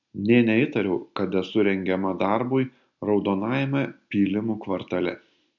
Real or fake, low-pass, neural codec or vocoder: real; 7.2 kHz; none